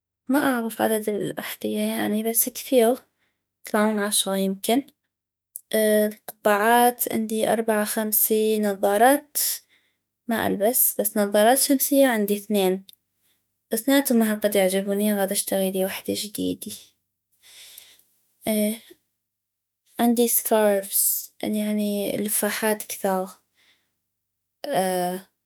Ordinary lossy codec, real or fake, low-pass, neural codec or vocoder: none; fake; none; autoencoder, 48 kHz, 32 numbers a frame, DAC-VAE, trained on Japanese speech